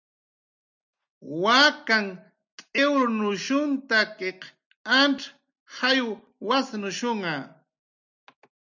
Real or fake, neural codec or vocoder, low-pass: real; none; 7.2 kHz